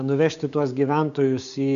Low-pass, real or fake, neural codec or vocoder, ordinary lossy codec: 7.2 kHz; fake; codec, 16 kHz, 6 kbps, DAC; MP3, 96 kbps